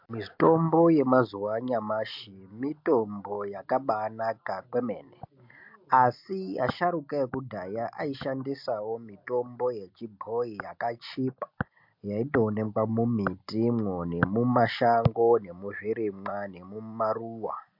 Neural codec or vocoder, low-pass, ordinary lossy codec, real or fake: none; 5.4 kHz; MP3, 48 kbps; real